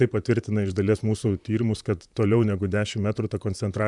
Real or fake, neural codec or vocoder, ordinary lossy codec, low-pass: fake; vocoder, 44.1 kHz, 128 mel bands, Pupu-Vocoder; MP3, 96 kbps; 10.8 kHz